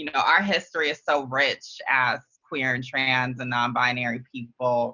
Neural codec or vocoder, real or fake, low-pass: none; real; 7.2 kHz